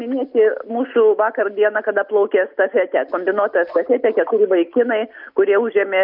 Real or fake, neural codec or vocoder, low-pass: real; none; 5.4 kHz